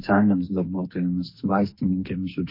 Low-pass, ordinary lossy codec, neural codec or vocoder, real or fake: 5.4 kHz; MP3, 32 kbps; codec, 44.1 kHz, 2.6 kbps, SNAC; fake